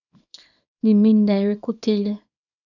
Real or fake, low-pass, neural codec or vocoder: fake; 7.2 kHz; codec, 24 kHz, 0.9 kbps, WavTokenizer, small release